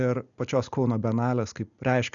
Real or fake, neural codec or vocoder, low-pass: real; none; 7.2 kHz